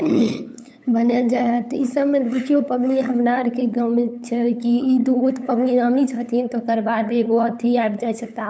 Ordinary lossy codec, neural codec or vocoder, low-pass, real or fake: none; codec, 16 kHz, 8 kbps, FunCodec, trained on LibriTTS, 25 frames a second; none; fake